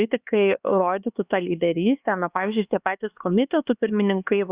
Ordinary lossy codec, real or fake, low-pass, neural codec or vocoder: Opus, 64 kbps; fake; 3.6 kHz; codec, 16 kHz, 2 kbps, X-Codec, HuBERT features, trained on LibriSpeech